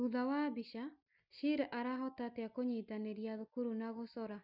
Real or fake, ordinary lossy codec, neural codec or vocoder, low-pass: real; none; none; 5.4 kHz